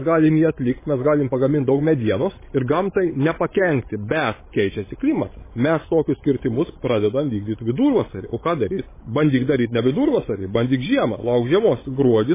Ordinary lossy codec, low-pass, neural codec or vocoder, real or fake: MP3, 16 kbps; 3.6 kHz; codec, 16 kHz, 16 kbps, FreqCodec, larger model; fake